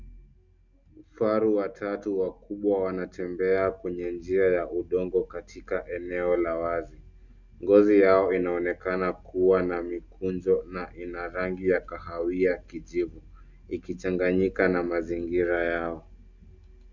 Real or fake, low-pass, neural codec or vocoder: real; 7.2 kHz; none